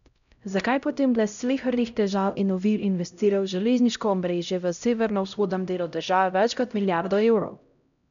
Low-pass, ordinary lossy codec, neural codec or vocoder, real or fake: 7.2 kHz; none; codec, 16 kHz, 0.5 kbps, X-Codec, HuBERT features, trained on LibriSpeech; fake